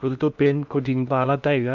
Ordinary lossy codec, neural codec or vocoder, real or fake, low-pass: none; codec, 16 kHz in and 24 kHz out, 0.8 kbps, FocalCodec, streaming, 65536 codes; fake; 7.2 kHz